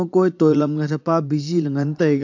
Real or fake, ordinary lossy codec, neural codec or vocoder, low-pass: fake; AAC, 48 kbps; vocoder, 44.1 kHz, 80 mel bands, Vocos; 7.2 kHz